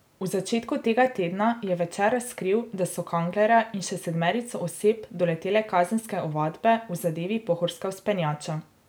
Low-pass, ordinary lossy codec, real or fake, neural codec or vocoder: none; none; real; none